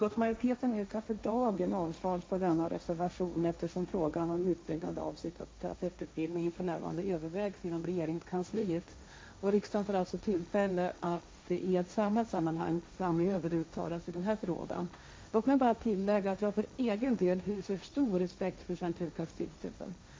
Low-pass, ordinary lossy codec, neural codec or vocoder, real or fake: none; none; codec, 16 kHz, 1.1 kbps, Voila-Tokenizer; fake